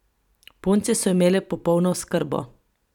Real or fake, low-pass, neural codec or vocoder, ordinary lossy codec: fake; 19.8 kHz; vocoder, 44.1 kHz, 128 mel bands every 512 samples, BigVGAN v2; none